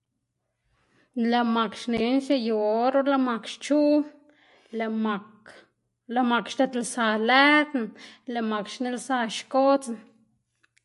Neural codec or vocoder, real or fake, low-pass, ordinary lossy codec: none; real; 14.4 kHz; MP3, 48 kbps